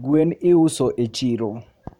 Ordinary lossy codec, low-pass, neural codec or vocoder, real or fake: MP3, 96 kbps; 19.8 kHz; vocoder, 44.1 kHz, 128 mel bands every 512 samples, BigVGAN v2; fake